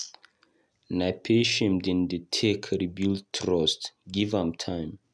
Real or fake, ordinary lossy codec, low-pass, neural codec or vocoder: real; none; none; none